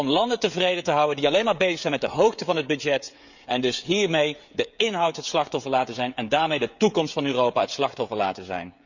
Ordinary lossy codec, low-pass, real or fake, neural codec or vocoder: none; 7.2 kHz; fake; codec, 16 kHz, 16 kbps, FreqCodec, smaller model